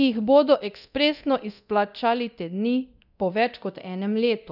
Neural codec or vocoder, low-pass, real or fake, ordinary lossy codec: codec, 24 kHz, 0.9 kbps, DualCodec; 5.4 kHz; fake; none